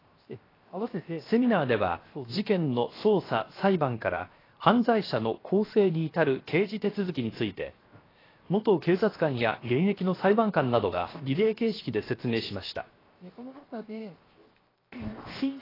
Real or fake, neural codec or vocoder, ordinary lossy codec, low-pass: fake; codec, 16 kHz, 0.7 kbps, FocalCodec; AAC, 24 kbps; 5.4 kHz